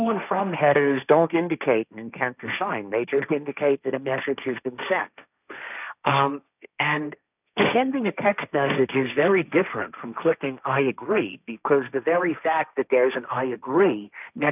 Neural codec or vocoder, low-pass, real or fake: codec, 16 kHz, 1.1 kbps, Voila-Tokenizer; 3.6 kHz; fake